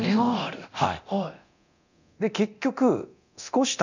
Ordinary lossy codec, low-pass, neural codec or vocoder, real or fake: none; 7.2 kHz; codec, 24 kHz, 0.9 kbps, DualCodec; fake